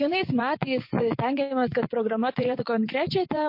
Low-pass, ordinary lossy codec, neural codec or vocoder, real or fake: 5.4 kHz; MP3, 32 kbps; vocoder, 24 kHz, 100 mel bands, Vocos; fake